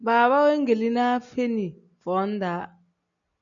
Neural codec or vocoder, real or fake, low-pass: none; real; 7.2 kHz